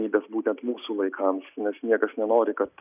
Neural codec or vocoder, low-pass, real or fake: none; 3.6 kHz; real